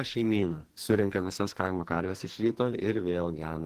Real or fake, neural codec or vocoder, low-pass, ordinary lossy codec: fake; codec, 44.1 kHz, 2.6 kbps, SNAC; 14.4 kHz; Opus, 16 kbps